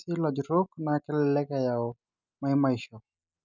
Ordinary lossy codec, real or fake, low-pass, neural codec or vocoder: none; real; 7.2 kHz; none